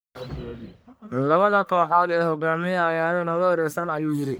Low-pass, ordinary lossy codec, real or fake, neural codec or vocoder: none; none; fake; codec, 44.1 kHz, 1.7 kbps, Pupu-Codec